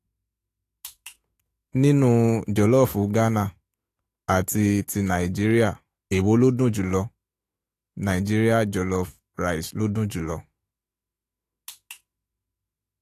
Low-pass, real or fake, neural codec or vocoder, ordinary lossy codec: 14.4 kHz; fake; autoencoder, 48 kHz, 128 numbers a frame, DAC-VAE, trained on Japanese speech; AAC, 48 kbps